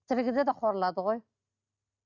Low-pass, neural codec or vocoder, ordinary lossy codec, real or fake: 7.2 kHz; none; Opus, 64 kbps; real